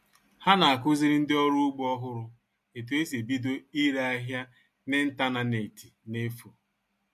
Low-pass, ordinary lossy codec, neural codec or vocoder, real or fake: 14.4 kHz; MP3, 64 kbps; none; real